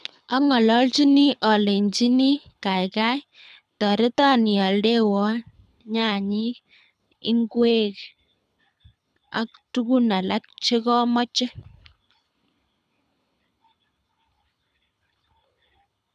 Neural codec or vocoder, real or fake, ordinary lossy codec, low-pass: codec, 24 kHz, 6 kbps, HILCodec; fake; none; none